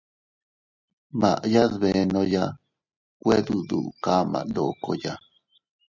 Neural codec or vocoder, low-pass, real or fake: none; 7.2 kHz; real